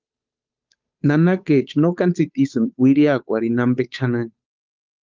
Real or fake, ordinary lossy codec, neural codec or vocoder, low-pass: fake; Opus, 32 kbps; codec, 16 kHz, 8 kbps, FunCodec, trained on Chinese and English, 25 frames a second; 7.2 kHz